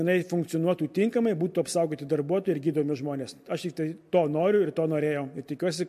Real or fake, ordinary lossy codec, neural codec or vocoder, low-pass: real; MP3, 64 kbps; none; 14.4 kHz